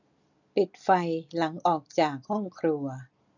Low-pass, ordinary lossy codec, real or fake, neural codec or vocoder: 7.2 kHz; none; real; none